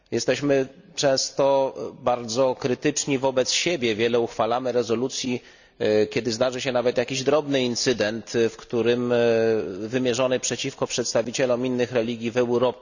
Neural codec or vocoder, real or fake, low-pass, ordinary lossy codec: none; real; 7.2 kHz; none